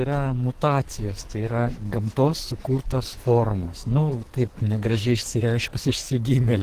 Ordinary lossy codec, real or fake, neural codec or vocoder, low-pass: Opus, 16 kbps; fake; codec, 44.1 kHz, 2.6 kbps, SNAC; 14.4 kHz